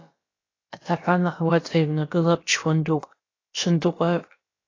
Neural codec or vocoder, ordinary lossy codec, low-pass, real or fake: codec, 16 kHz, about 1 kbps, DyCAST, with the encoder's durations; AAC, 32 kbps; 7.2 kHz; fake